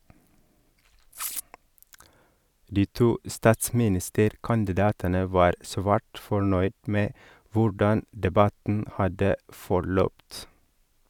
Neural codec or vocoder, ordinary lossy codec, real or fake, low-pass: none; none; real; 19.8 kHz